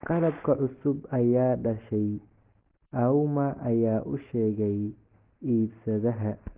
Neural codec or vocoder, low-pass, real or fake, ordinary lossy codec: none; 3.6 kHz; real; Opus, 16 kbps